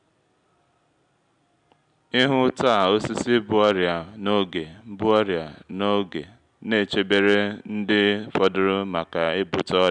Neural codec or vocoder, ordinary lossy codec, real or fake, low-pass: none; none; real; 9.9 kHz